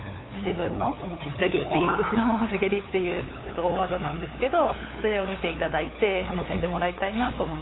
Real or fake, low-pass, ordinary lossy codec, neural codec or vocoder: fake; 7.2 kHz; AAC, 16 kbps; codec, 16 kHz, 8 kbps, FunCodec, trained on LibriTTS, 25 frames a second